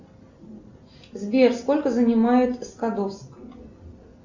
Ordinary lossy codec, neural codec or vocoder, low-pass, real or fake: Opus, 64 kbps; none; 7.2 kHz; real